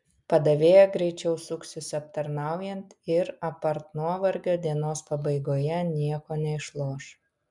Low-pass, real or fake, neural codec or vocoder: 10.8 kHz; real; none